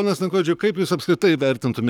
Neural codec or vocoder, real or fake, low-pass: codec, 44.1 kHz, 7.8 kbps, Pupu-Codec; fake; 19.8 kHz